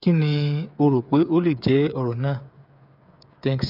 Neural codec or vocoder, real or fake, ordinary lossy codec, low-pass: codec, 16 kHz, 8 kbps, FreqCodec, smaller model; fake; none; 5.4 kHz